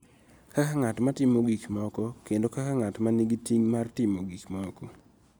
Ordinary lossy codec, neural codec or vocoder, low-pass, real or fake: none; none; none; real